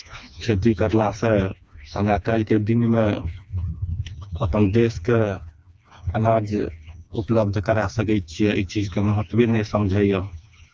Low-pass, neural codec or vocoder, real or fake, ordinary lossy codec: none; codec, 16 kHz, 2 kbps, FreqCodec, smaller model; fake; none